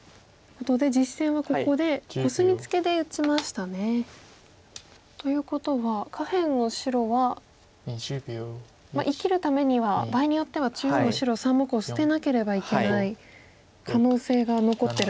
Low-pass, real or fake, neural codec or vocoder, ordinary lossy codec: none; real; none; none